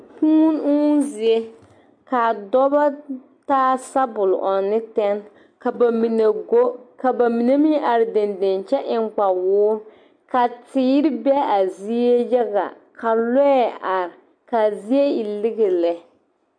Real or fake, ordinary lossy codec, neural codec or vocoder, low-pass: real; MP3, 64 kbps; none; 9.9 kHz